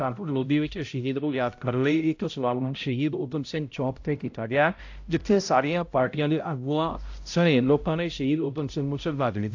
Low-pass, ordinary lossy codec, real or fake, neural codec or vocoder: 7.2 kHz; AAC, 48 kbps; fake; codec, 16 kHz, 0.5 kbps, X-Codec, HuBERT features, trained on balanced general audio